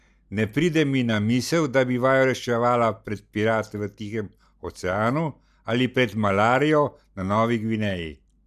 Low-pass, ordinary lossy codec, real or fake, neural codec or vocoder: 14.4 kHz; none; real; none